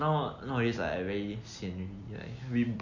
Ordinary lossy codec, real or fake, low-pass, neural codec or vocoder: none; real; 7.2 kHz; none